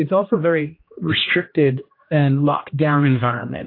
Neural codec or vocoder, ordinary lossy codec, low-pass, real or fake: codec, 16 kHz, 1 kbps, X-Codec, HuBERT features, trained on general audio; AAC, 32 kbps; 5.4 kHz; fake